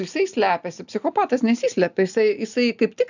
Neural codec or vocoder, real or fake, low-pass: none; real; 7.2 kHz